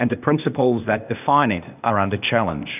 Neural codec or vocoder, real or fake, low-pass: codec, 16 kHz, 0.8 kbps, ZipCodec; fake; 3.6 kHz